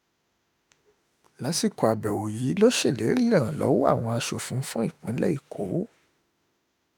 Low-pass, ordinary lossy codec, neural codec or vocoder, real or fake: none; none; autoencoder, 48 kHz, 32 numbers a frame, DAC-VAE, trained on Japanese speech; fake